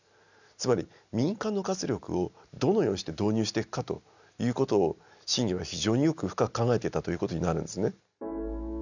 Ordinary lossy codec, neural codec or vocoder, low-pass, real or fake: none; none; 7.2 kHz; real